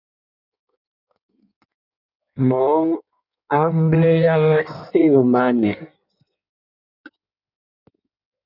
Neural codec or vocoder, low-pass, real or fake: codec, 16 kHz in and 24 kHz out, 1.1 kbps, FireRedTTS-2 codec; 5.4 kHz; fake